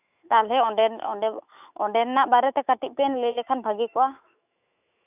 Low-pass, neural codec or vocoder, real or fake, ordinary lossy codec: 3.6 kHz; autoencoder, 48 kHz, 128 numbers a frame, DAC-VAE, trained on Japanese speech; fake; none